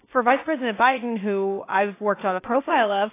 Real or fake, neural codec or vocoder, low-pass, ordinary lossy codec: fake; codec, 16 kHz, 0.8 kbps, ZipCodec; 3.6 kHz; AAC, 24 kbps